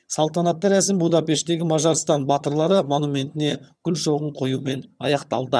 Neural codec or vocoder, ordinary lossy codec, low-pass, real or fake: vocoder, 22.05 kHz, 80 mel bands, HiFi-GAN; none; none; fake